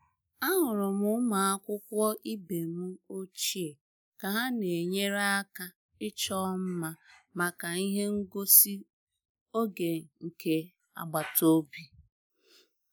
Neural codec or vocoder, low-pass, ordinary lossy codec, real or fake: none; none; none; real